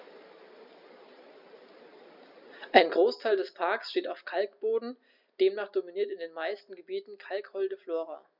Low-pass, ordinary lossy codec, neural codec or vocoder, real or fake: 5.4 kHz; none; none; real